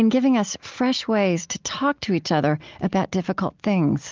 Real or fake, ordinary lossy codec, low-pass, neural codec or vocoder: real; Opus, 32 kbps; 7.2 kHz; none